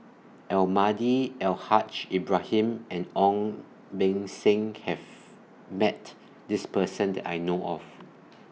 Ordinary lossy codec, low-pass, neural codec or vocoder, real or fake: none; none; none; real